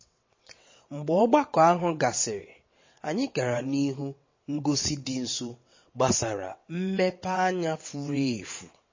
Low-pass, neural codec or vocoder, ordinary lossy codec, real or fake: 7.2 kHz; vocoder, 22.05 kHz, 80 mel bands, WaveNeXt; MP3, 32 kbps; fake